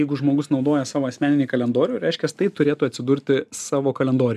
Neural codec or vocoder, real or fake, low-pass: none; real; 14.4 kHz